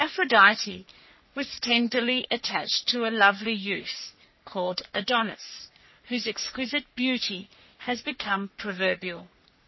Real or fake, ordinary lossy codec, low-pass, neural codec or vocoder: fake; MP3, 24 kbps; 7.2 kHz; codec, 44.1 kHz, 3.4 kbps, Pupu-Codec